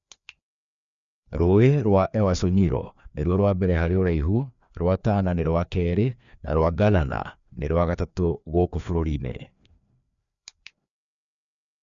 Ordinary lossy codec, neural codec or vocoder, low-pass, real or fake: none; codec, 16 kHz, 2 kbps, FreqCodec, larger model; 7.2 kHz; fake